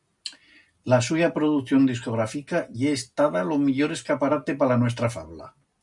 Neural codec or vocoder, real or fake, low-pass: none; real; 10.8 kHz